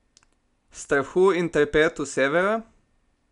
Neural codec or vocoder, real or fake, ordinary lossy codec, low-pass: none; real; none; 10.8 kHz